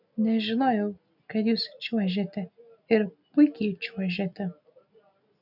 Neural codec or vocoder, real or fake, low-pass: none; real; 5.4 kHz